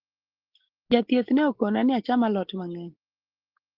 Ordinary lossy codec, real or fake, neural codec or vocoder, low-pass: Opus, 16 kbps; real; none; 5.4 kHz